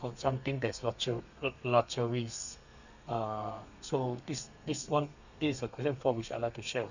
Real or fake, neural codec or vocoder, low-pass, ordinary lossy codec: fake; codec, 32 kHz, 1.9 kbps, SNAC; 7.2 kHz; none